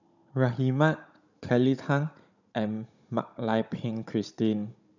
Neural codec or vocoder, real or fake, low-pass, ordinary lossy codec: codec, 16 kHz, 16 kbps, FunCodec, trained on Chinese and English, 50 frames a second; fake; 7.2 kHz; none